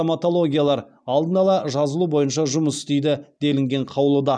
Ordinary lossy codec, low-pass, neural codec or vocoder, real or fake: none; 9.9 kHz; none; real